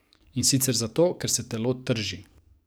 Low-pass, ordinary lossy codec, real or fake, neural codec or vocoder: none; none; fake; codec, 44.1 kHz, 7.8 kbps, DAC